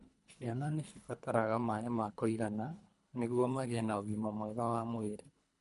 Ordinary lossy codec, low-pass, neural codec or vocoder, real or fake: none; 10.8 kHz; codec, 24 kHz, 3 kbps, HILCodec; fake